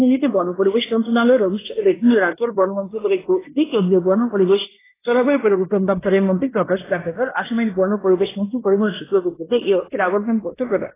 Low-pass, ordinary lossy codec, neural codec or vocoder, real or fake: 3.6 kHz; AAC, 16 kbps; codec, 16 kHz in and 24 kHz out, 0.9 kbps, LongCat-Audio-Codec, fine tuned four codebook decoder; fake